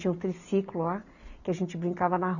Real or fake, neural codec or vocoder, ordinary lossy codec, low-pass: real; none; none; 7.2 kHz